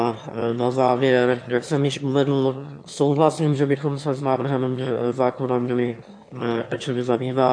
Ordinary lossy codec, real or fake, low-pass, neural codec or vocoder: AAC, 64 kbps; fake; 9.9 kHz; autoencoder, 22.05 kHz, a latent of 192 numbers a frame, VITS, trained on one speaker